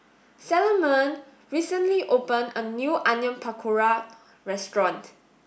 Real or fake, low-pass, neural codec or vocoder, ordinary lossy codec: real; none; none; none